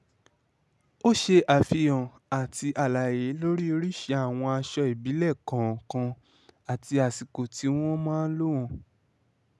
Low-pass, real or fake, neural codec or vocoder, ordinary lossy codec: none; real; none; none